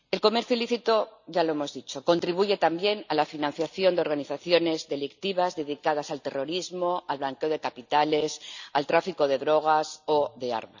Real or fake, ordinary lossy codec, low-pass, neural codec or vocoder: real; none; 7.2 kHz; none